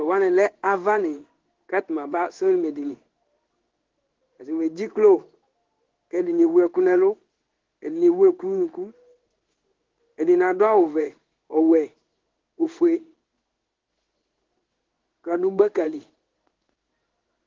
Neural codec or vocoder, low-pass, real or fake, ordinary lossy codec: codec, 16 kHz in and 24 kHz out, 1 kbps, XY-Tokenizer; 7.2 kHz; fake; Opus, 16 kbps